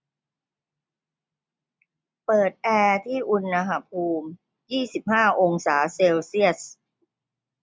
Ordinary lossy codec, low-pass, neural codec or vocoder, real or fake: none; none; none; real